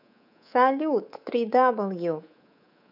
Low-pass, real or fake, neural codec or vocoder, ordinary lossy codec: 5.4 kHz; fake; codec, 24 kHz, 3.1 kbps, DualCodec; none